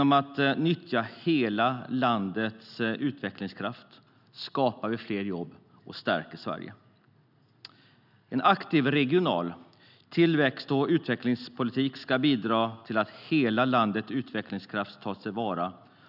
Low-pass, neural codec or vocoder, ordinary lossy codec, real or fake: 5.4 kHz; none; none; real